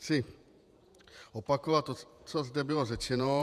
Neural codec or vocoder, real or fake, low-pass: vocoder, 48 kHz, 128 mel bands, Vocos; fake; 14.4 kHz